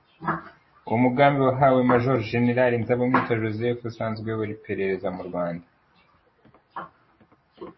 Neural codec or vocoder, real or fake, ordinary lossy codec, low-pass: none; real; MP3, 24 kbps; 7.2 kHz